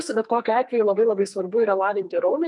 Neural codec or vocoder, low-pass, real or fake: codec, 44.1 kHz, 2.6 kbps, SNAC; 10.8 kHz; fake